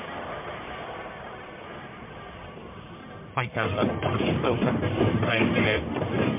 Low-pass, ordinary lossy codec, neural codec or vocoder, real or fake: 3.6 kHz; MP3, 32 kbps; codec, 44.1 kHz, 1.7 kbps, Pupu-Codec; fake